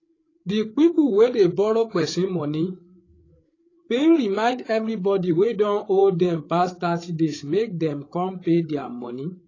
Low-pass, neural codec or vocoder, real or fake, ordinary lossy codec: 7.2 kHz; codec, 16 kHz, 8 kbps, FreqCodec, larger model; fake; AAC, 32 kbps